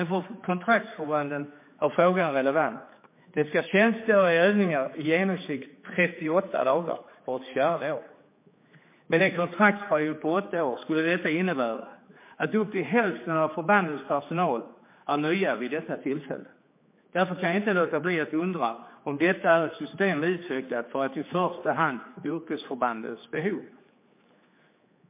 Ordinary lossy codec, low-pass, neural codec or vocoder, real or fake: MP3, 24 kbps; 3.6 kHz; codec, 16 kHz, 4 kbps, X-Codec, HuBERT features, trained on general audio; fake